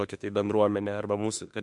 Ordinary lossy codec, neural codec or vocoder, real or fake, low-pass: MP3, 64 kbps; autoencoder, 48 kHz, 32 numbers a frame, DAC-VAE, trained on Japanese speech; fake; 10.8 kHz